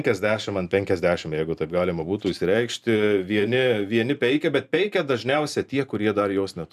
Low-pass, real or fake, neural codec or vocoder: 14.4 kHz; fake; vocoder, 44.1 kHz, 128 mel bands every 512 samples, BigVGAN v2